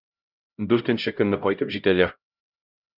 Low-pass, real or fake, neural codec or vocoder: 5.4 kHz; fake; codec, 16 kHz, 0.5 kbps, X-Codec, HuBERT features, trained on LibriSpeech